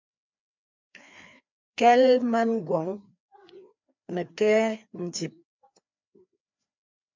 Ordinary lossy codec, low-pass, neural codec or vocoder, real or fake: MP3, 64 kbps; 7.2 kHz; codec, 16 kHz, 2 kbps, FreqCodec, larger model; fake